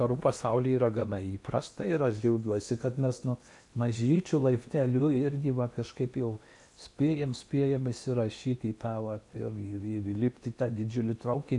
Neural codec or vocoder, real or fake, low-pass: codec, 16 kHz in and 24 kHz out, 0.8 kbps, FocalCodec, streaming, 65536 codes; fake; 10.8 kHz